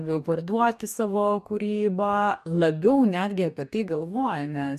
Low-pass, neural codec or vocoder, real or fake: 14.4 kHz; codec, 44.1 kHz, 2.6 kbps, DAC; fake